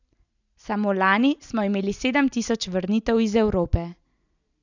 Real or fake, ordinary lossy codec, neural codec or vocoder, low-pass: real; none; none; 7.2 kHz